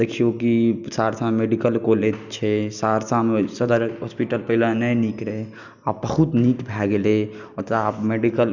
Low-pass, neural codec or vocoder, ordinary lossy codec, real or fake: 7.2 kHz; none; none; real